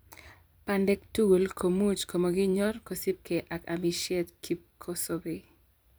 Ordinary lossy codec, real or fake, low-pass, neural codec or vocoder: none; fake; none; vocoder, 44.1 kHz, 128 mel bands every 256 samples, BigVGAN v2